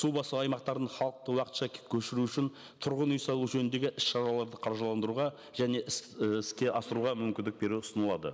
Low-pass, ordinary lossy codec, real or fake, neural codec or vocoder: none; none; real; none